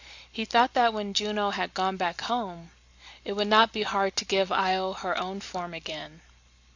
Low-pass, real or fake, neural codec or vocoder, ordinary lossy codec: 7.2 kHz; real; none; AAC, 48 kbps